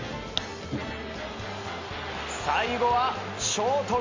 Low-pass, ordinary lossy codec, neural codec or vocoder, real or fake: 7.2 kHz; MP3, 48 kbps; none; real